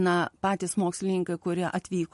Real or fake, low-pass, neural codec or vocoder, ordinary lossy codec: real; 14.4 kHz; none; MP3, 48 kbps